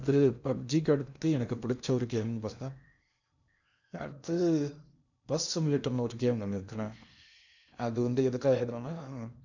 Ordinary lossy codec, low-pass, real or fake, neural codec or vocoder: none; 7.2 kHz; fake; codec, 16 kHz in and 24 kHz out, 0.8 kbps, FocalCodec, streaming, 65536 codes